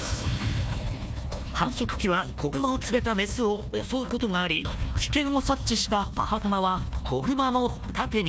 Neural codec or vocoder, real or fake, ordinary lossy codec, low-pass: codec, 16 kHz, 1 kbps, FunCodec, trained on Chinese and English, 50 frames a second; fake; none; none